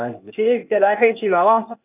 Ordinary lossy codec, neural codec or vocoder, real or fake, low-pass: none; codec, 16 kHz, 0.8 kbps, ZipCodec; fake; 3.6 kHz